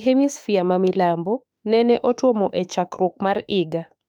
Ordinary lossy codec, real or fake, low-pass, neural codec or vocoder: none; fake; 19.8 kHz; autoencoder, 48 kHz, 32 numbers a frame, DAC-VAE, trained on Japanese speech